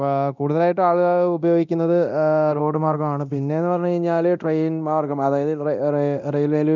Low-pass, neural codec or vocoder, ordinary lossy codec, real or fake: 7.2 kHz; codec, 24 kHz, 0.9 kbps, DualCodec; none; fake